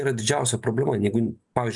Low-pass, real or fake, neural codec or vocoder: 10.8 kHz; real; none